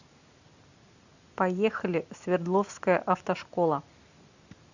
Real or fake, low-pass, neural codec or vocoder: real; 7.2 kHz; none